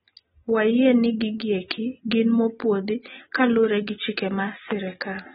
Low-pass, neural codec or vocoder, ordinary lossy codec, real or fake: 19.8 kHz; none; AAC, 16 kbps; real